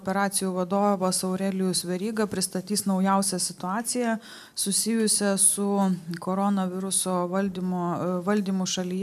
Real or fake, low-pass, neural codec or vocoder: real; 14.4 kHz; none